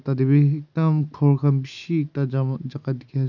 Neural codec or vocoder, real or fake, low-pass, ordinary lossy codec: none; real; 7.2 kHz; none